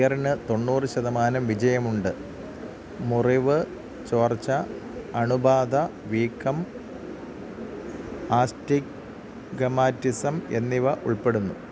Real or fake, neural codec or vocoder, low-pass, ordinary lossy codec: real; none; none; none